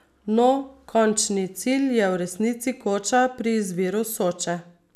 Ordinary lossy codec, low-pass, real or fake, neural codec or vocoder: none; 14.4 kHz; real; none